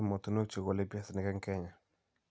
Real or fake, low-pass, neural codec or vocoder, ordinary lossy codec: real; none; none; none